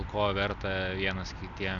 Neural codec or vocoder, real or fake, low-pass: none; real; 7.2 kHz